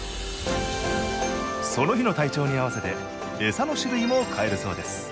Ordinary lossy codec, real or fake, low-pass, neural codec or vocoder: none; real; none; none